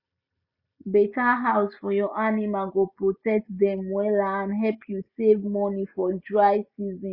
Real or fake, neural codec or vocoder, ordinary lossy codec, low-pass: real; none; Opus, 64 kbps; 5.4 kHz